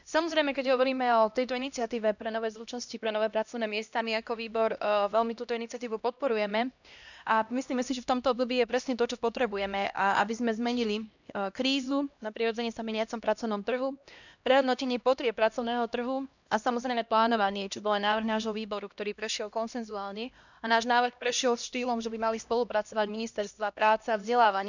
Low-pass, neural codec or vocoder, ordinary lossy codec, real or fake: 7.2 kHz; codec, 16 kHz, 1 kbps, X-Codec, HuBERT features, trained on LibriSpeech; none; fake